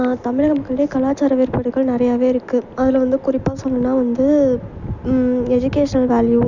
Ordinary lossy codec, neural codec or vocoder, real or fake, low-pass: none; none; real; 7.2 kHz